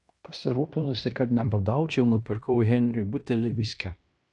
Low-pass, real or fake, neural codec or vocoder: 10.8 kHz; fake; codec, 16 kHz in and 24 kHz out, 0.9 kbps, LongCat-Audio-Codec, fine tuned four codebook decoder